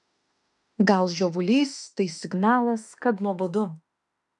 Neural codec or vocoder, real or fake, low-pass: autoencoder, 48 kHz, 32 numbers a frame, DAC-VAE, trained on Japanese speech; fake; 10.8 kHz